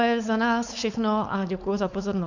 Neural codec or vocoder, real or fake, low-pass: codec, 16 kHz, 4.8 kbps, FACodec; fake; 7.2 kHz